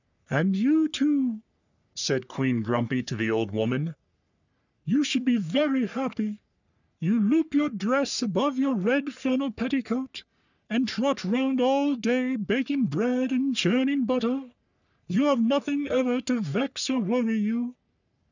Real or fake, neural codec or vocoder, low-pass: fake; codec, 44.1 kHz, 3.4 kbps, Pupu-Codec; 7.2 kHz